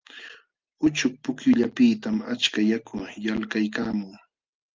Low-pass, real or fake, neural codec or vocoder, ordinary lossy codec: 7.2 kHz; real; none; Opus, 24 kbps